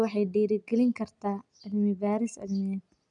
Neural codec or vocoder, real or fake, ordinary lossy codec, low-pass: none; real; none; 9.9 kHz